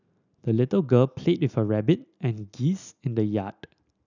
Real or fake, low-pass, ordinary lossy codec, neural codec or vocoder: real; 7.2 kHz; none; none